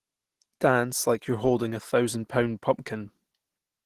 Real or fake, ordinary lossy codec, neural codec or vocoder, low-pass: real; Opus, 16 kbps; none; 14.4 kHz